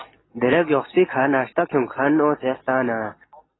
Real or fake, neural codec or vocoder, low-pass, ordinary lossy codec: real; none; 7.2 kHz; AAC, 16 kbps